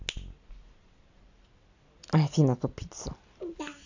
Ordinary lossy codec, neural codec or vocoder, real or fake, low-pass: AAC, 48 kbps; none; real; 7.2 kHz